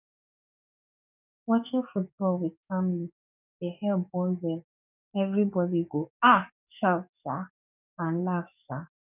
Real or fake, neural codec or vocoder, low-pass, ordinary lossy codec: fake; codec, 44.1 kHz, 7.8 kbps, DAC; 3.6 kHz; none